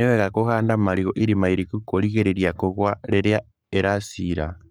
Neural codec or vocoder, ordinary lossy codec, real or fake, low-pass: codec, 44.1 kHz, 7.8 kbps, Pupu-Codec; none; fake; none